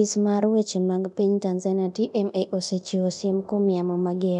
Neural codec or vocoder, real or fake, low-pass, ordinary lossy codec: codec, 24 kHz, 0.9 kbps, DualCodec; fake; 10.8 kHz; none